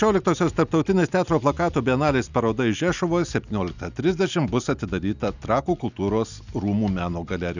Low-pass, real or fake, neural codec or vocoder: 7.2 kHz; real; none